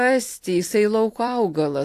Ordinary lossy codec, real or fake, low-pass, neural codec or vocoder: AAC, 64 kbps; fake; 14.4 kHz; vocoder, 44.1 kHz, 128 mel bands every 256 samples, BigVGAN v2